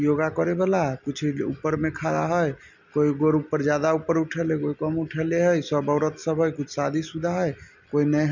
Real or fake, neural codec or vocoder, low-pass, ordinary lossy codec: real; none; 7.2 kHz; none